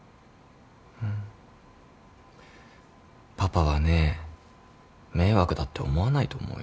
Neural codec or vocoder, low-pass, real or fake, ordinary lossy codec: none; none; real; none